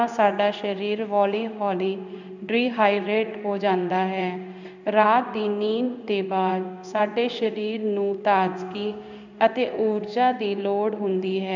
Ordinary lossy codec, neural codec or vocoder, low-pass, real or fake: none; codec, 16 kHz in and 24 kHz out, 1 kbps, XY-Tokenizer; 7.2 kHz; fake